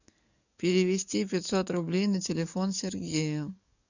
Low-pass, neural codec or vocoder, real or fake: 7.2 kHz; codec, 44.1 kHz, 7.8 kbps, DAC; fake